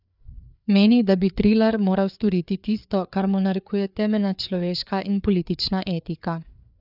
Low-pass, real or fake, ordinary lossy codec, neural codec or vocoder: 5.4 kHz; fake; none; codec, 16 kHz, 4 kbps, FreqCodec, larger model